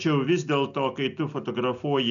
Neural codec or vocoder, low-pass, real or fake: none; 7.2 kHz; real